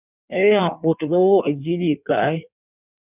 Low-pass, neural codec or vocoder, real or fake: 3.6 kHz; codec, 16 kHz in and 24 kHz out, 1.1 kbps, FireRedTTS-2 codec; fake